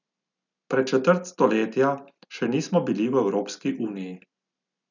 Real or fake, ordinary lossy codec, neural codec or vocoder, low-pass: real; none; none; 7.2 kHz